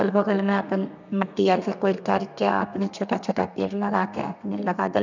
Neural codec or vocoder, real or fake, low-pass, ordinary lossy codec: codec, 32 kHz, 1.9 kbps, SNAC; fake; 7.2 kHz; none